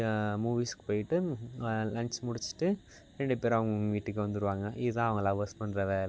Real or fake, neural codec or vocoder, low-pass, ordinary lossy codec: real; none; none; none